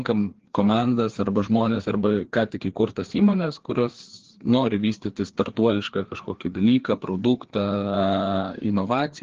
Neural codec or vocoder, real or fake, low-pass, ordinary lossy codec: codec, 16 kHz, 2 kbps, FreqCodec, larger model; fake; 7.2 kHz; Opus, 16 kbps